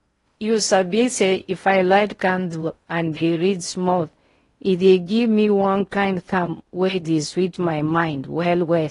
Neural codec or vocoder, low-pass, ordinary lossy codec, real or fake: codec, 16 kHz in and 24 kHz out, 0.6 kbps, FocalCodec, streaming, 4096 codes; 10.8 kHz; AAC, 32 kbps; fake